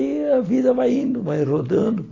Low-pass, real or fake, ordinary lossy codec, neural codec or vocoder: 7.2 kHz; real; AAC, 32 kbps; none